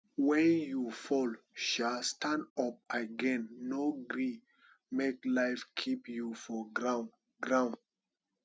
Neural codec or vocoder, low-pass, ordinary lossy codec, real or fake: none; none; none; real